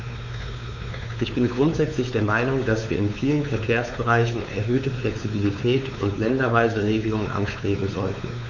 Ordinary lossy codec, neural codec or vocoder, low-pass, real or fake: none; codec, 16 kHz, 4 kbps, X-Codec, WavLM features, trained on Multilingual LibriSpeech; 7.2 kHz; fake